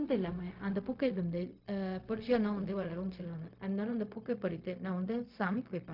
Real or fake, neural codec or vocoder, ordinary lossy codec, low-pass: fake; codec, 16 kHz, 0.4 kbps, LongCat-Audio-Codec; MP3, 48 kbps; 5.4 kHz